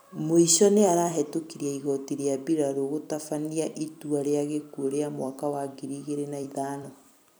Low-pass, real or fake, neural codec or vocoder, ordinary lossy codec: none; fake; vocoder, 44.1 kHz, 128 mel bands every 256 samples, BigVGAN v2; none